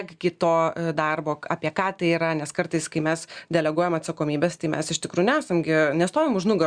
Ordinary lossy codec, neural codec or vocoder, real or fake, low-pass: Opus, 64 kbps; none; real; 9.9 kHz